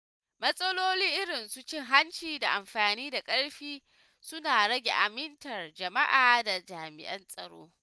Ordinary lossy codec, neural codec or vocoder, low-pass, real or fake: none; none; none; real